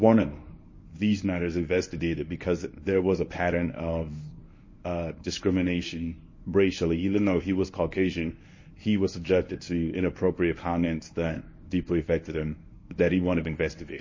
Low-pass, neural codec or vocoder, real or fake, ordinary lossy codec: 7.2 kHz; codec, 24 kHz, 0.9 kbps, WavTokenizer, medium speech release version 1; fake; MP3, 32 kbps